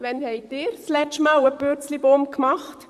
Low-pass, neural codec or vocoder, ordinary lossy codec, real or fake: 14.4 kHz; vocoder, 44.1 kHz, 128 mel bands, Pupu-Vocoder; none; fake